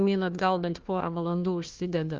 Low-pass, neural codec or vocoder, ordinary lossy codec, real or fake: 7.2 kHz; codec, 16 kHz, 1 kbps, FunCodec, trained on Chinese and English, 50 frames a second; Opus, 24 kbps; fake